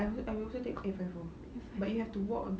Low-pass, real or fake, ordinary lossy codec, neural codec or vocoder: none; real; none; none